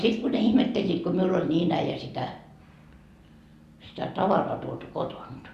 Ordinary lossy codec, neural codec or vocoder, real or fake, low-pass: Opus, 64 kbps; none; real; 14.4 kHz